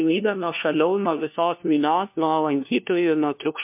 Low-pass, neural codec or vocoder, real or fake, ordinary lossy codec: 3.6 kHz; codec, 16 kHz, 1 kbps, FunCodec, trained on LibriTTS, 50 frames a second; fake; MP3, 32 kbps